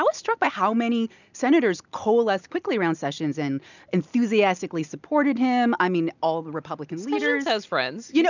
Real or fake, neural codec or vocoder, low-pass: real; none; 7.2 kHz